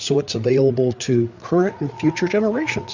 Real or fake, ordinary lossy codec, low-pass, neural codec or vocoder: fake; Opus, 64 kbps; 7.2 kHz; codec, 16 kHz, 8 kbps, FreqCodec, larger model